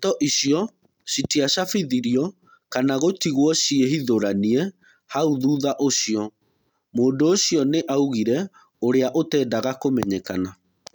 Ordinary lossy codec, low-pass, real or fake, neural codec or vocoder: none; 19.8 kHz; real; none